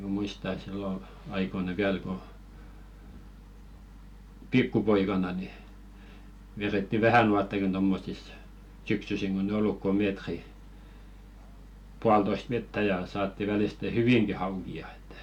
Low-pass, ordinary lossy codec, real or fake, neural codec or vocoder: 19.8 kHz; none; real; none